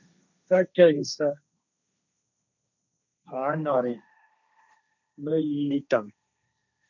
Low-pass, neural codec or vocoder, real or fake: 7.2 kHz; codec, 16 kHz, 1.1 kbps, Voila-Tokenizer; fake